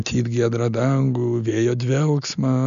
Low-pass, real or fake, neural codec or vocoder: 7.2 kHz; real; none